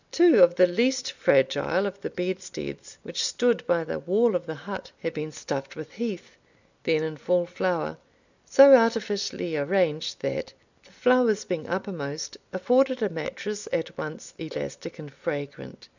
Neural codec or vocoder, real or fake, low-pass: none; real; 7.2 kHz